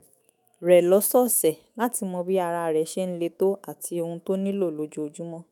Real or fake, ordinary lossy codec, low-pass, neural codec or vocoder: fake; none; none; autoencoder, 48 kHz, 128 numbers a frame, DAC-VAE, trained on Japanese speech